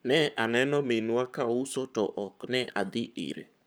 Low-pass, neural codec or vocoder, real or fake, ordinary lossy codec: none; codec, 44.1 kHz, 7.8 kbps, Pupu-Codec; fake; none